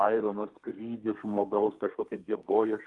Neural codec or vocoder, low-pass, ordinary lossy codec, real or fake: codec, 32 kHz, 1.9 kbps, SNAC; 10.8 kHz; Opus, 32 kbps; fake